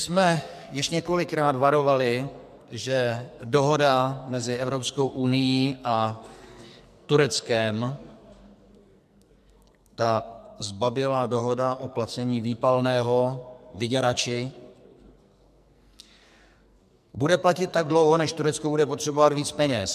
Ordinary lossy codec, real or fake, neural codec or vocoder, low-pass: MP3, 96 kbps; fake; codec, 44.1 kHz, 2.6 kbps, SNAC; 14.4 kHz